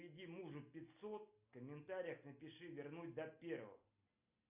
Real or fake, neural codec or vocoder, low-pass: real; none; 3.6 kHz